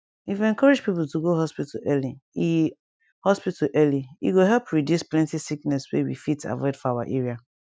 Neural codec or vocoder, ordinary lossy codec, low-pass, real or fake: none; none; none; real